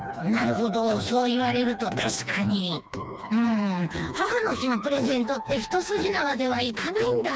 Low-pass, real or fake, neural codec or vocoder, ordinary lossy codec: none; fake; codec, 16 kHz, 2 kbps, FreqCodec, smaller model; none